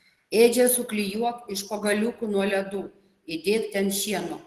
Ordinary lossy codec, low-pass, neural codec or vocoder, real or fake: Opus, 16 kbps; 14.4 kHz; none; real